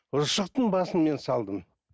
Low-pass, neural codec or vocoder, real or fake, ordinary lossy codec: none; none; real; none